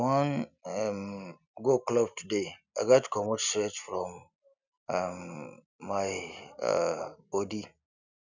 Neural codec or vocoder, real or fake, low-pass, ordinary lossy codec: none; real; 7.2 kHz; none